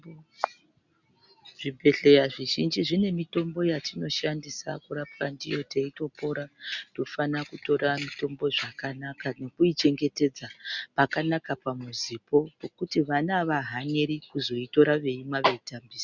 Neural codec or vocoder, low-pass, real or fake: none; 7.2 kHz; real